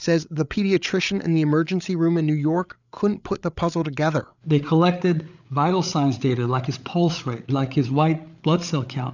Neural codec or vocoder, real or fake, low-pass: codec, 16 kHz, 8 kbps, FreqCodec, larger model; fake; 7.2 kHz